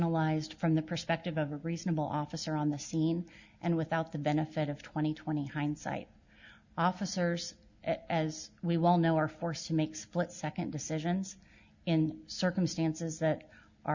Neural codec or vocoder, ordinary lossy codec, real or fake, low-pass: none; Opus, 64 kbps; real; 7.2 kHz